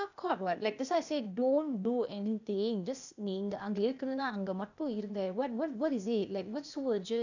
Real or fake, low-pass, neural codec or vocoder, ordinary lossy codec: fake; 7.2 kHz; codec, 16 kHz, 0.8 kbps, ZipCodec; none